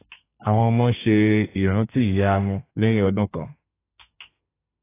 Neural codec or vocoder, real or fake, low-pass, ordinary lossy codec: codec, 32 kHz, 1.9 kbps, SNAC; fake; 3.6 kHz; AAC, 24 kbps